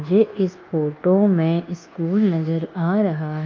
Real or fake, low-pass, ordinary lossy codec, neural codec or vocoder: fake; 7.2 kHz; Opus, 24 kbps; codec, 24 kHz, 1.2 kbps, DualCodec